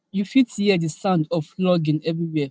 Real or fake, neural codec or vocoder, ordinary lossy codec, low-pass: real; none; none; none